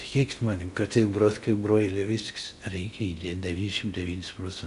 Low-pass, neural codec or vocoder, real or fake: 10.8 kHz; codec, 16 kHz in and 24 kHz out, 0.6 kbps, FocalCodec, streaming, 2048 codes; fake